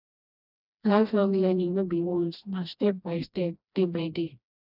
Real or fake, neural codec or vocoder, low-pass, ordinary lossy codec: fake; codec, 16 kHz, 1 kbps, FreqCodec, smaller model; 5.4 kHz; none